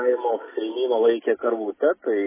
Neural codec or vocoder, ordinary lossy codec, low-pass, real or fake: none; MP3, 16 kbps; 3.6 kHz; real